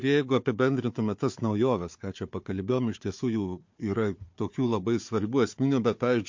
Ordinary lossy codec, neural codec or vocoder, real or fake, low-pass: MP3, 48 kbps; codec, 16 kHz, 4 kbps, FunCodec, trained on Chinese and English, 50 frames a second; fake; 7.2 kHz